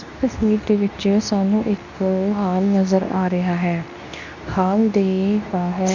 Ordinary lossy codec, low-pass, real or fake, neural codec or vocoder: none; 7.2 kHz; fake; codec, 24 kHz, 0.9 kbps, WavTokenizer, medium speech release version 2